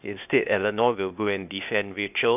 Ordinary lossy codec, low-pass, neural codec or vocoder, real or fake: none; 3.6 kHz; codec, 16 kHz, 0.8 kbps, ZipCodec; fake